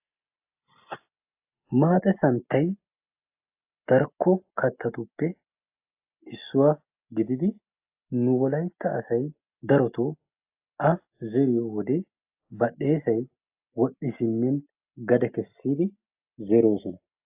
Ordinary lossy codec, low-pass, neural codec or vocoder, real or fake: AAC, 32 kbps; 3.6 kHz; none; real